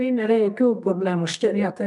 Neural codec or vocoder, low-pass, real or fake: codec, 24 kHz, 0.9 kbps, WavTokenizer, medium music audio release; 10.8 kHz; fake